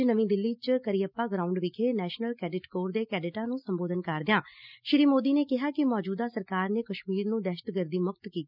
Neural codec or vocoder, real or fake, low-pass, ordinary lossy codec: none; real; 5.4 kHz; none